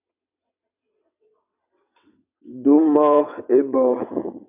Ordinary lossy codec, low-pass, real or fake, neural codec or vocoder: MP3, 32 kbps; 3.6 kHz; fake; vocoder, 22.05 kHz, 80 mel bands, WaveNeXt